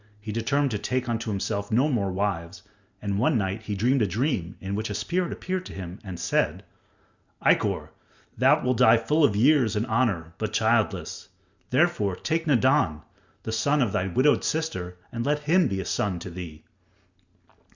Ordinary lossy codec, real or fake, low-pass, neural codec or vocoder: Opus, 64 kbps; real; 7.2 kHz; none